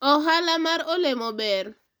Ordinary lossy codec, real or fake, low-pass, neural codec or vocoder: none; real; none; none